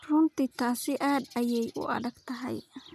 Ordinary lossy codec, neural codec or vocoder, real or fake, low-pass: none; none; real; 14.4 kHz